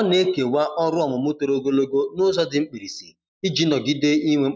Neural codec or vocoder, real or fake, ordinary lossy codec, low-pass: none; real; none; none